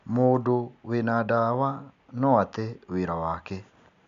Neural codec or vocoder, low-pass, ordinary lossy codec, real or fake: none; 7.2 kHz; MP3, 64 kbps; real